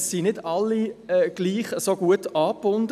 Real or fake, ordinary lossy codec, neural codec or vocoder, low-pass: real; none; none; 14.4 kHz